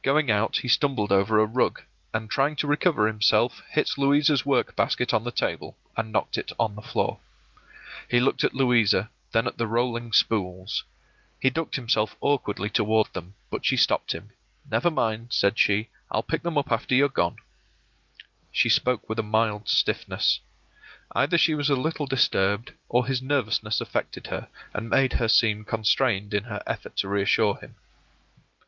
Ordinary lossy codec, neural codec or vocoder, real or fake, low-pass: Opus, 32 kbps; none; real; 7.2 kHz